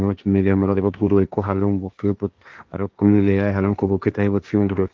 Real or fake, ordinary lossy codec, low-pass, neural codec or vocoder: fake; Opus, 32 kbps; 7.2 kHz; codec, 16 kHz, 1.1 kbps, Voila-Tokenizer